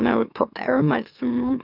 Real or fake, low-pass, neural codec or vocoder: fake; 5.4 kHz; autoencoder, 44.1 kHz, a latent of 192 numbers a frame, MeloTTS